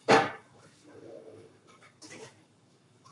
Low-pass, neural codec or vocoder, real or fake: 10.8 kHz; codec, 44.1 kHz, 7.8 kbps, Pupu-Codec; fake